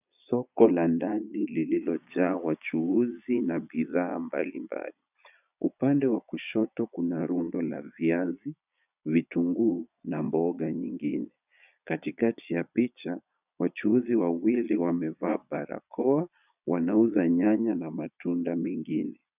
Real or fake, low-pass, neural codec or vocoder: fake; 3.6 kHz; vocoder, 22.05 kHz, 80 mel bands, Vocos